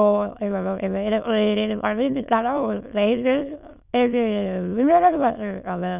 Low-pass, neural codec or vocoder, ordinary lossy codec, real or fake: 3.6 kHz; autoencoder, 22.05 kHz, a latent of 192 numbers a frame, VITS, trained on many speakers; none; fake